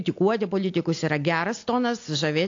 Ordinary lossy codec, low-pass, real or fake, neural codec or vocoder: AAC, 48 kbps; 7.2 kHz; real; none